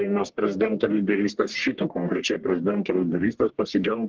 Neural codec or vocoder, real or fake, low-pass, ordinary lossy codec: codec, 44.1 kHz, 1.7 kbps, Pupu-Codec; fake; 7.2 kHz; Opus, 16 kbps